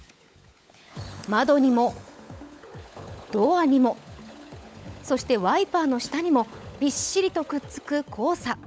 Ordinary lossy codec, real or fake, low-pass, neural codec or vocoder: none; fake; none; codec, 16 kHz, 16 kbps, FunCodec, trained on LibriTTS, 50 frames a second